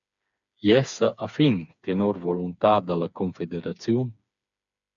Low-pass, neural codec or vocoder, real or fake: 7.2 kHz; codec, 16 kHz, 4 kbps, FreqCodec, smaller model; fake